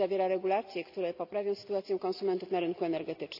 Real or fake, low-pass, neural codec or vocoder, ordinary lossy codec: real; 5.4 kHz; none; none